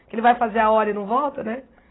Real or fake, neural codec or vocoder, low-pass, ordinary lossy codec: real; none; 7.2 kHz; AAC, 16 kbps